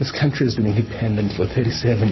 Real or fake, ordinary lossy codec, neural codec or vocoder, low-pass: fake; MP3, 24 kbps; codec, 44.1 kHz, 7.8 kbps, Pupu-Codec; 7.2 kHz